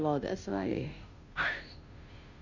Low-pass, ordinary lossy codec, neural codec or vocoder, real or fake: 7.2 kHz; none; codec, 16 kHz, 0.5 kbps, FunCodec, trained on Chinese and English, 25 frames a second; fake